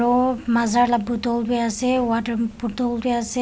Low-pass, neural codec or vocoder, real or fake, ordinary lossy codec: none; none; real; none